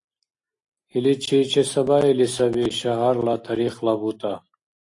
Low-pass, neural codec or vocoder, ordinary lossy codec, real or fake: 10.8 kHz; none; AAC, 48 kbps; real